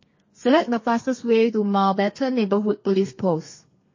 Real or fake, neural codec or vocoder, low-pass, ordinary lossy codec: fake; codec, 32 kHz, 1.9 kbps, SNAC; 7.2 kHz; MP3, 32 kbps